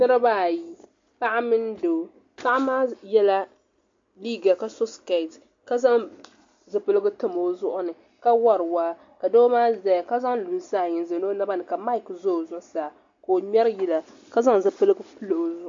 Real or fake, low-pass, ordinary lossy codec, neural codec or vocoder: real; 7.2 kHz; MP3, 48 kbps; none